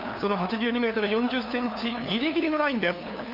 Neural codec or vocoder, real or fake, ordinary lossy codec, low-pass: codec, 16 kHz, 2 kbps, FunCodec, trained on LibriTTS, 25 frames a second; fake; none; 5.4 kHz